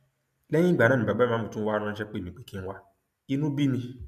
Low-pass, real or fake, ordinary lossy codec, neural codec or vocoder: 14.4 kHz; real; MP3, 96 kbps; none